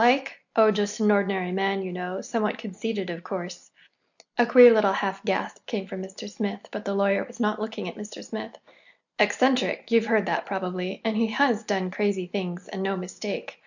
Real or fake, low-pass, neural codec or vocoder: real; 7.2 kHz; none